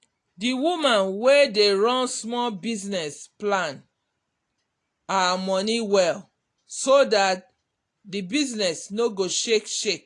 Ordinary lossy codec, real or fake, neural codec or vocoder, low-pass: AAC, 48 kbps; real; none; 10.8 kHz